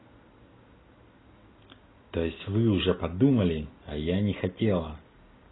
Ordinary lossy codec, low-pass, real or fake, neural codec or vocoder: AAC, 16 kbps; 7.2 kHz; real; none